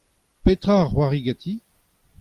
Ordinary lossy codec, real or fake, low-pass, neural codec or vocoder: Opus, 32 kbps; real; 14.4 kHz; none